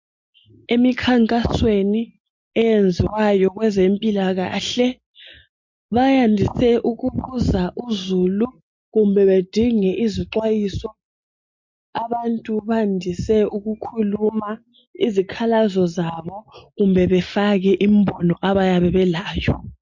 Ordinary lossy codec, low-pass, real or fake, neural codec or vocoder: MP3, 48 kbps; 7.2 kHz; real; none